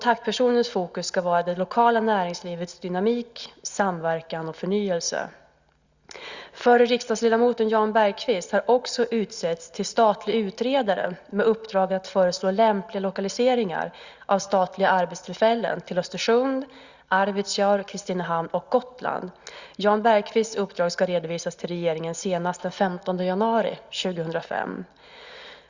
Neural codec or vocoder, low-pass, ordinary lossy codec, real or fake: none; 7.2 kHz; Opus, 64 kbps; real